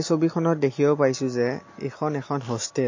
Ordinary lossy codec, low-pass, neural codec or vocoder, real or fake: MP3, 32 kbps; 7.2 kHz; none; real